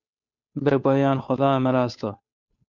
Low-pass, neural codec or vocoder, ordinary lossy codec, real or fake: 7.2 kHz; codec, 16 kHz, 2 kbps, FunCodec, trained on Chinese and English, 25 frames a second; MP3, 64 kbps; fake